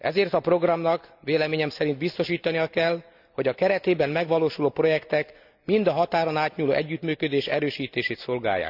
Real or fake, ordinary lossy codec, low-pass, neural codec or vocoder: real; none; 5.4 kHz; none